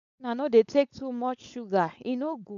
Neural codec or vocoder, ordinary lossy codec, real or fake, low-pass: codec, 16 kHz, 4.8 kbps, FACodec; none; fake; 7.2 kHz